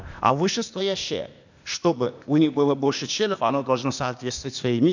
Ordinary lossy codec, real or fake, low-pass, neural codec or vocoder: none; fake; 7.2 kHz; codec, 16 kHz, 0.8 kbps, ZipCodec